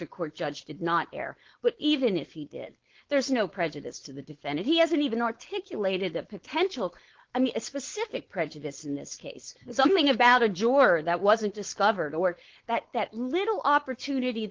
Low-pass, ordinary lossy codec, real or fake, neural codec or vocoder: 7.2 kHz; Opus, 16 kbps; fake; codec, 16 kHz, 4.8 kbps, FACodec